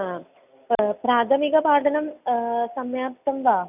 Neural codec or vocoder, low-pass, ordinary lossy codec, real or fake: none; 3.6 kHz; none; real